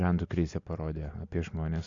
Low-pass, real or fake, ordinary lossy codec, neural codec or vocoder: 7.2 kHz; real; MP3, 64 kbps; none